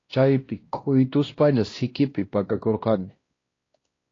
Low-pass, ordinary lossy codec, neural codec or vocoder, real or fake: 7.2 kHz; AAC, 32 kbps; codec, 16 kHz, 1 kbps, X-Codec, WavLM features, trained on Multilingual LibriSpeech; fake